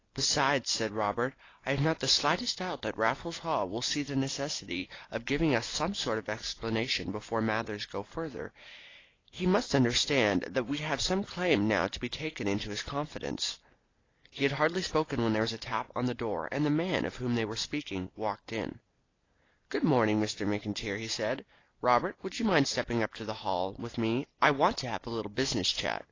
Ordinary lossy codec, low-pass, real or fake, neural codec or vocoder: AAC, 32 kbps; 7.2 kHz; real; none